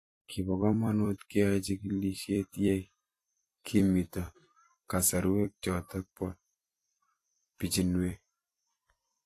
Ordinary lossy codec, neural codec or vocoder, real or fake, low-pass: AAC, 48 kbps; vocoder, 44.1 kHz, 128 mel bands every 256 samples, BigVGAN v2; fake; 14.4 kHz